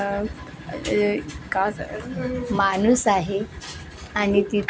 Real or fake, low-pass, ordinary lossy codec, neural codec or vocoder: real; none; none; none